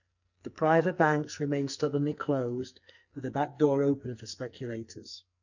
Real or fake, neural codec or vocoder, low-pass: fake; codec, 44.1 kHz, 2.6 kbps, SNAC; 7.2 kHz